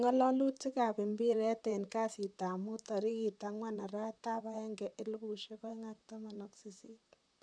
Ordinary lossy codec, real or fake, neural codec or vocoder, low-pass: none; fake; vocoder, 44.1 kHz, 128 mel bands, Pupu-Vocoder; 9.9 kHz